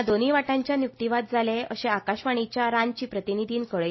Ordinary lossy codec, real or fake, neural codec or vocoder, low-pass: MP3, 24 kbps; real; none; 7.2 kHz